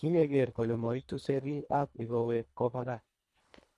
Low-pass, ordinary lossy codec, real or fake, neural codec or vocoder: none; none; fake; codec, 24 kHz, 1.5 kbps, HILCodec